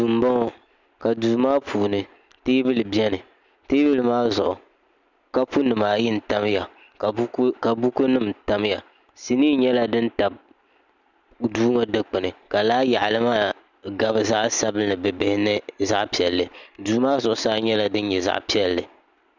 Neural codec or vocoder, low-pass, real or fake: none; 7.2 kHz; real